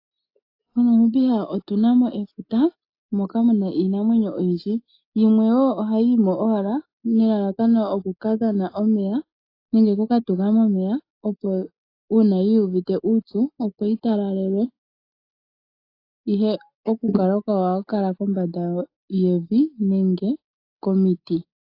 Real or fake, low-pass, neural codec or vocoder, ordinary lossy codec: real; 5.4 kHz; none; AAC, 32 kbps